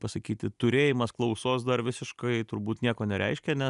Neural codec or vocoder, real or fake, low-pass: none; real; 10.8 kHz